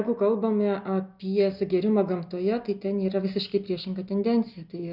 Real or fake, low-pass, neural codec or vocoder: real; 5.4 kHz; none